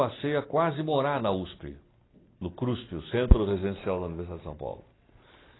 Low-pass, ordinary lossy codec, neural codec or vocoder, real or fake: 7.2 kHz; AAC, 16 kbps; none; real